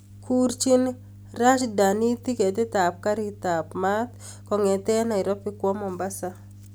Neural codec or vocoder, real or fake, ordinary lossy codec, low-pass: none; real; none; none